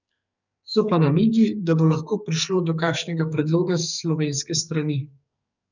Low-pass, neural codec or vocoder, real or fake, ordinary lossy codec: 7.2 kHz; codec, 44.1 kHz, 2.6 kbps, SNAC; fake; none